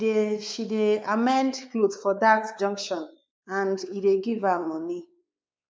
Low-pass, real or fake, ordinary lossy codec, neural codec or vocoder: none; fake; none; codec, 16 kHz, 4 kbps, X-Codec, WavLM features, trained on Multilingual LibriSpeech